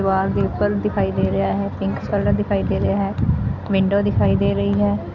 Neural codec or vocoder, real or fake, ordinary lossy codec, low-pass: none; real; none; 7.2 kHz